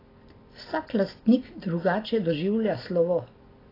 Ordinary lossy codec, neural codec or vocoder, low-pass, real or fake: AAC, 24 kbps; none; 5.4 kHz; real